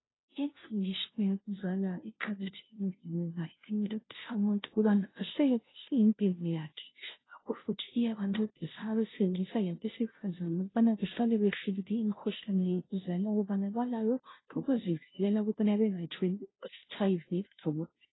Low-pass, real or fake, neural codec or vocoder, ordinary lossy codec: 7.2 kHz; fake; codec, 16 kHz, 0.5 kbps, FunCodec, trained on Chinese and English, 25 frames a second; AAC, 16 kbps